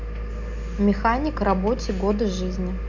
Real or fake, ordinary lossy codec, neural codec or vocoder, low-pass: real; none; none; 7.2 kHz